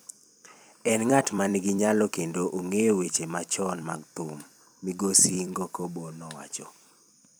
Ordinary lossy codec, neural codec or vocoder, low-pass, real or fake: none; vocoder, 44.1 kHz, 128 mel bands every 256 samples, BigVGAN v2; none; fake